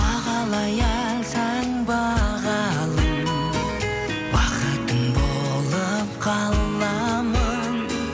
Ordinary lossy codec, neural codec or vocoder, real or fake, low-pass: none; none; real; none